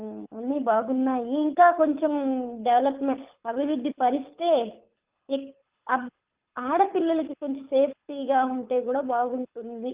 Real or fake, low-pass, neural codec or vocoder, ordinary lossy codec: fake; 3.6 kHz; codec, 24 kHz, 6 kbps, HILCodec; Opus, 32 kbps